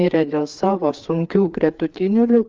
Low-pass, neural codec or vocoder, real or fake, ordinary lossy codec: 7.2 kHz; codec, 16 kHz, 4 kbps, FreqCodec, larger model; fake; Opus, 32 kbps